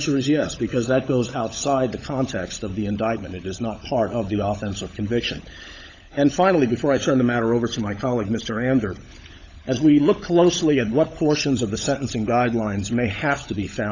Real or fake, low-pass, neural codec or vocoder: fake; 7.2 kHz; codec, 16 kHz, 16 kbps, FunCodec, trained on LibriTTS, 50 frames a second